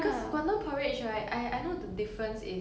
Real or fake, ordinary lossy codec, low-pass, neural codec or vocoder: real; none; none; none